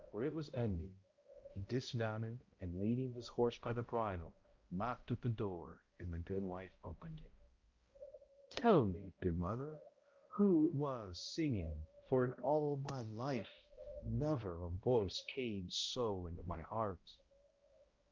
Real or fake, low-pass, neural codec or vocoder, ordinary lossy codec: fake; 7.2 kHz; codec, 16 kHz, 0.5 kbps, X-Codec, HuBERT features, trained on balanced general audio; Opus, 24 kbps